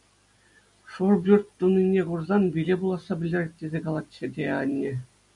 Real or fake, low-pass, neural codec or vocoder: real; 10.8 kHz; none